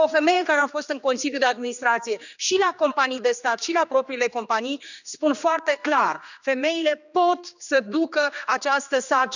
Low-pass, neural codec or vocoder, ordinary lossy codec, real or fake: 7.2 kHz; codec, 16 kHz, 2 kbps, X-Codec, HuBERT features, trained on general audio; none; fake